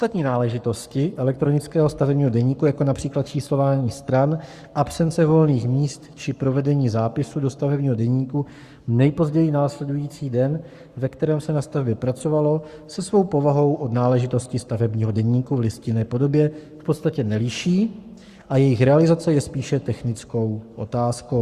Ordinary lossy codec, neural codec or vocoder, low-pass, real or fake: Opus, 64 kbps; codec, 44.1 kHz, 7.8 kbps, Pupu-Codec; 14.4 kHz; fake